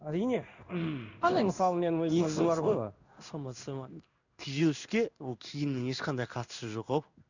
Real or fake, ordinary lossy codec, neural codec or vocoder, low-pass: fake; none; codec, 16 kHz in and 24 kHz out, 1 kbps, XY-Tokenizer; 7.2 kHz